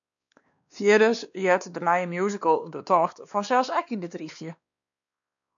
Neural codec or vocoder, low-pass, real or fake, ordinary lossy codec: codec, 16 kHz, 2 kbps, X-Codec, WavLM features, trained on Multilingual LibriSpeech; 7.2 kHz; fake; MP3, 96 kbps